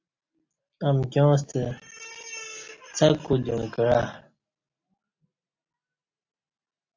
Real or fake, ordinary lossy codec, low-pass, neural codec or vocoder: real; AAC, 48 kbps; 7.2 kHz; none